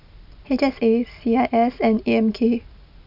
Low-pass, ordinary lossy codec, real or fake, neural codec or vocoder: 5.4 kHz; none; real; none